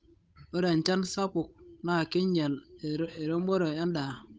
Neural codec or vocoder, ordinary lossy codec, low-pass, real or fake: codec, 16 kHz, 8 kbps, FunCodec, trained on Chinese and English, 25 frames a second; none; none; fake